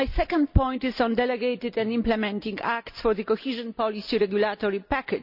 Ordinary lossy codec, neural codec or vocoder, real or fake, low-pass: none; none; real; 5.4 kHz